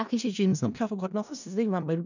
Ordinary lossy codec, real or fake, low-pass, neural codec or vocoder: none; fake; 7.2 kHz; codec, 16 kHz in and 24 kHz out, 0.4 kbps, LongCat-Audio-Codec, four codebook decoder